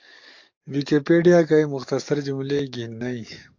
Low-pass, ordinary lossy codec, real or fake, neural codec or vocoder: 7.2 kHz; AAC, 48 kbps; fake; codec, 44.1 kHz, 7.8 kbps, DAC